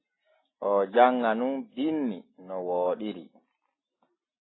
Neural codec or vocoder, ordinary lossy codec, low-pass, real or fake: none; AAC, 16 kbps; 7.2 kHz; real